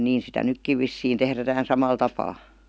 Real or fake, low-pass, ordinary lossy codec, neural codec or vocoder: real; none; none; none